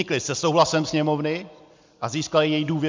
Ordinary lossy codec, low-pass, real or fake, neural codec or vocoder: MP3, 64 kbps; 7.2 kHz; real; none